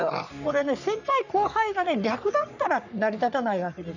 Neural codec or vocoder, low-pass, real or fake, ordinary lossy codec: codec, 44.1 kHz, 3.4 kbps, Pupu-Codec; 7.2 kHz; fake; none